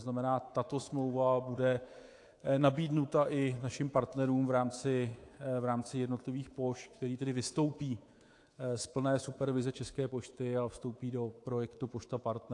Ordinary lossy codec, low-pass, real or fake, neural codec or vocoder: AAC, 48 kbps; 10.8 kHz; fake; codec, 24 kHz, 3.1 kbps, DualCodec